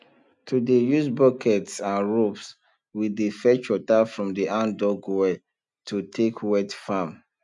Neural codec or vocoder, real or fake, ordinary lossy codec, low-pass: none; real; none; 10.8 kHz